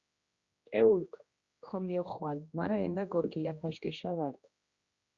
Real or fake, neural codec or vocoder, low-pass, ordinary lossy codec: fake; codec, 16 kHz, 1 kbps, X-Codec, HuBERT features, trained on general audio; 7.2 kHz; Opus, 64 kbps